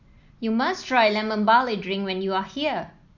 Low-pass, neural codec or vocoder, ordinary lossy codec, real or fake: 7.2 kHz; none; none; real